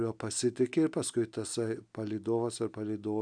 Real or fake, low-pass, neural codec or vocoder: real; 9.9 kHz; none